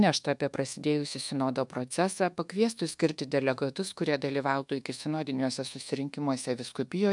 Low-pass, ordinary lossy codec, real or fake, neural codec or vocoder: 10.8 kHz; MP3, 96 kbps; fake; codec, 24 kHz, 1.2 kbps, DualCodec